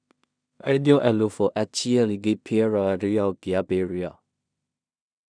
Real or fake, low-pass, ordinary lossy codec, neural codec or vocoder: fake; 9.9 kHz; none; codec, 16 kHz in and 24 kHz out, 0.4 kbps, LongCat-Audio-Codec, two codebook decoder